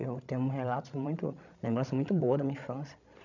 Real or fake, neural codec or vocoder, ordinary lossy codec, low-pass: fake; codec, 16 kHz, 16 kbps, FreqCodec, larger model; none; 7.2 kHz